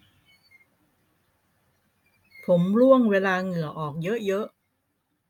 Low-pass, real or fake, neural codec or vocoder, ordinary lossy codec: 19.8 kHz; real; none; none